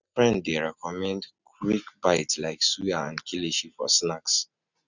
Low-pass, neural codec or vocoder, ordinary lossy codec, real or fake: 7.2 kHz; none; none; real